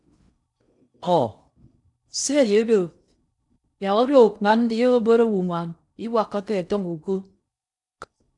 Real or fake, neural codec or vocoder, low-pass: fake; codec, 16 kHz in and 24 kHz out, 0.6 kbps, FocalCodec, streaming, 4096 codes; 10.8 kHz